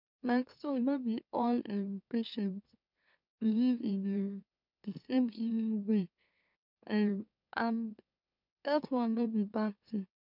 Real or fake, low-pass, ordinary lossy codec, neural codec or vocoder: fake; 5.4 kHz; none; autoencoder, 44.1 kHz, a latent of 192 numbers a frame, MeloTTS